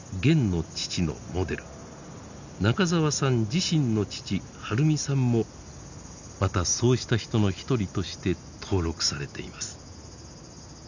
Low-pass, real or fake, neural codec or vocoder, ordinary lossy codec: 7.2 kHz; real; none; none